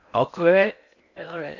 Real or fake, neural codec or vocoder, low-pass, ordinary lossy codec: fake; codec, 16 kHz in and 24 kHz out, 0.8 kbps, FocalCodec, streaming, 65536 codes; 7.2 kHz; AAC, 48 kbps